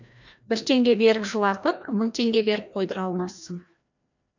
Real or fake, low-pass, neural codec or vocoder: fake; 7.2 kHz; codec, 16 kHz, 1 kbps, FreqCodec, larger model